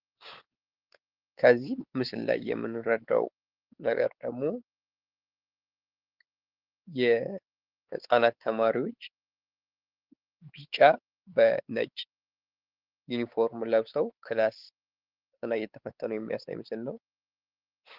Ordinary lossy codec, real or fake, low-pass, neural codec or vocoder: Opus, 16 kbps; fake; 5.4 kHz; codec, 16 kHz, 4 kbps, X-Codec, WavLM features, trained on Multilingual LibriSpeech